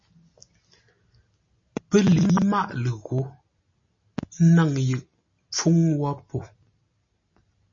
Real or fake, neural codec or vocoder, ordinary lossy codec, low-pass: real; none; MP3, 32 kbps; 7.2 kHz